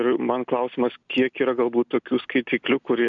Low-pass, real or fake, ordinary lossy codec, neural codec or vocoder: 7.2 kHz; real; MP3, 96 kbps; none